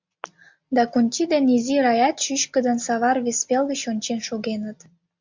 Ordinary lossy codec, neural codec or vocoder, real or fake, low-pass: MP3, 64 kbps; none; real; 7.2 kHz